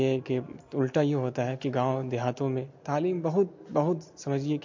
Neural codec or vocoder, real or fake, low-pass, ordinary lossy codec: none; real; 7.2 kHz; MP3, 48 kbps